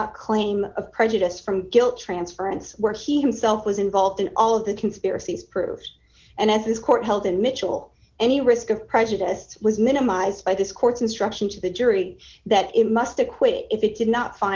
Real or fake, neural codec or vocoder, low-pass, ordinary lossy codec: real; none; 7.2 kHz; Opus, 16 kbps